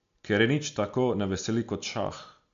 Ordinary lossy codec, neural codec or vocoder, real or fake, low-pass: MP3, 48 kbps; none; real; 7.2 kHz